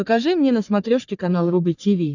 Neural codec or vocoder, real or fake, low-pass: codec, 44.1 kHz, 3.4 kbps, Pupu-Codec; fake; 7.2 kHz